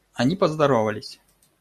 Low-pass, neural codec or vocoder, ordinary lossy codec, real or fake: 14.4 kHz; none; MP3, 96 kbps; real